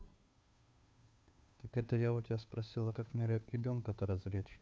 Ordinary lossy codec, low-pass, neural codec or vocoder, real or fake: none; none; codec, 16 kHz, 2 kbps, FunCodec, trained on Chinese and English, 25 frames a second; fake